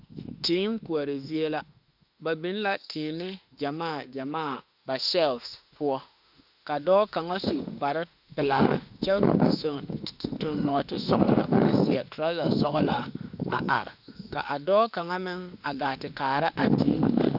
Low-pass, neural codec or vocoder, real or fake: 5.4 kHz; autoencoder, 48 kHz, 32 numbers a frame, DAC-VAE, trained on Japanese speech; fake